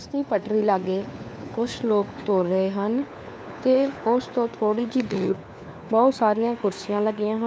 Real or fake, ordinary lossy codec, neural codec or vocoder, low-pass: fake; none; codec, 16 kHz, 4 kbps, FunCodec, trained on LibriTTS, 50 frames a second; none